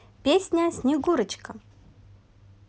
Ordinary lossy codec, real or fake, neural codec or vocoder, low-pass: none; real; none; none